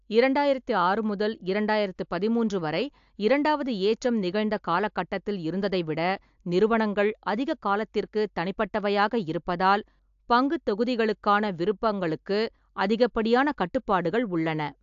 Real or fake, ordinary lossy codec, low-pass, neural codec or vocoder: real; AAC, 64 kbps; 7.2 kHz; none